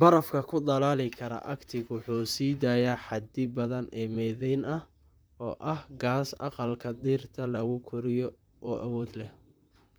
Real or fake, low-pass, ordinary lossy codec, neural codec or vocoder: fake; none; none; vocoder, 44.1 kHz, 128 mel bands, Pupu-Vocoder